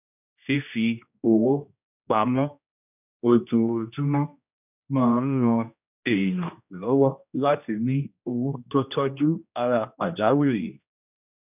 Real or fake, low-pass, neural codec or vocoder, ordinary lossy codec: fake; 3.6 kHz; codec, 16 kHz, 1 kbps, X-Codec, HuBERT features, trained on general audio; none